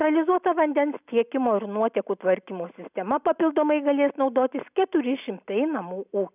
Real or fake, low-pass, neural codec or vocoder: real; 3.6 kHz; none